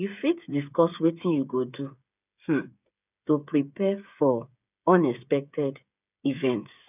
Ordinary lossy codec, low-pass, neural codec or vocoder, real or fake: none; 3.6 kHz; codec, 16 kHz, 8 kbps, FreqCodec, smaller model; fake